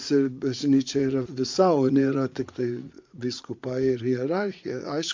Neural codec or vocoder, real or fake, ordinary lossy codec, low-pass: vocoder, 44.1 kHz, 80 mel bands, Vocos; fake; MP3, 48 kbps; 7.2 kHz